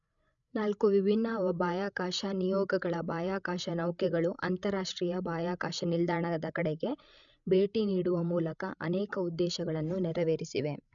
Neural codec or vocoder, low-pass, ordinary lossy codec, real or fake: codec, 16 kHz, 16 kbps, FreqCodec, larger model; 7.2 kHz; none; fake